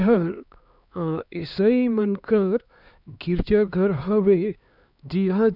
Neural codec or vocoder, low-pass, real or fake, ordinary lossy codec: codec, 16 kHz, 2 kbps, X-Codec, HuBERT features, trained on LibriSpeech; 5.4 kHz; fake; none